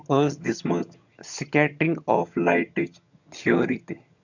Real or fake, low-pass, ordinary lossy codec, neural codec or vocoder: fake; 7.2 kHz; none; vocoder, 22.05 kHz, 80 mel bands, HiFi-GAN